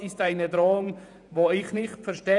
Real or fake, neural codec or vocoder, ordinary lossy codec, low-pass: fake; vocoder, 48 kHz, 128 mel bands, Vocos; none; 10.8 kHz